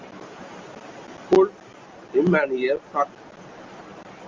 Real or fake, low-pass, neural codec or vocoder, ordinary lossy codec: real; 7.2 kHz; none; Opus, 32 kbps